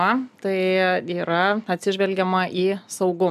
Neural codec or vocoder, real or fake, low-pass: none; real; 14.4 kHz